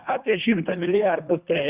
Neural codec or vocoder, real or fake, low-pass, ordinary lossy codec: codec, 24 kHz, 1.5 kbps, HILCodec; fake; 3.6 kHz; Opus, 64 kbps